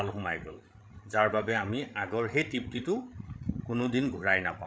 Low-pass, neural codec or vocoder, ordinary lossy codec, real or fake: none; codec, 16 kHz, 16 kbps, FreqCodec, larger model; none; fake